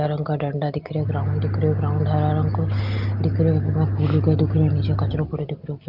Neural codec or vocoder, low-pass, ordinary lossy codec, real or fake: none; 5.4 kHz; Opus, 32 kbps; real